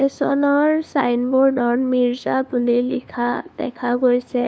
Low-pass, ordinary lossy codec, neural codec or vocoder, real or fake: none; none; codec, 16 kHz, 2 kbps, FunCodec, trained on LibriTTS, 25 frames a second; fake